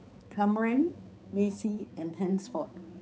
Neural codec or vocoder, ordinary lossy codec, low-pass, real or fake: codec, 16 kHz, 4 kbps, X-Codec, HuBERT features, trained on balanced general audio; none; none; fake